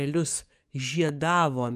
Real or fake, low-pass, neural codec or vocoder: fake; 14.4 kHz; codec, 44.1 kHz, 7.8 kbps, DAC